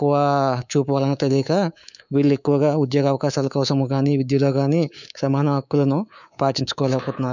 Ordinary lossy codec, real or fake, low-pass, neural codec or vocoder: none; fake; 7.2 kHz; codec, 24 kHz, 3.1 kbps, DualCodec